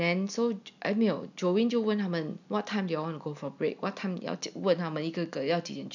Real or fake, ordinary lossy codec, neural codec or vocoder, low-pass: real; none; none; 7.2 kHz